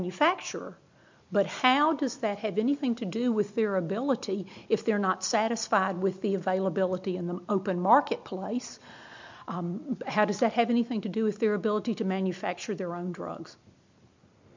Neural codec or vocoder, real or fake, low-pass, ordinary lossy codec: none; real; 7.2 kHz; MP3, 64 kbps